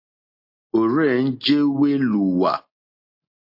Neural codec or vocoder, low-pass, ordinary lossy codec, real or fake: none; 5.4 kHz; MP3, 32 kbps; real